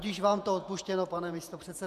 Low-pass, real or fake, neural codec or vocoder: 14.4 kHz; fake; vocoder, 48 kHz, 128 mel bands, Vocos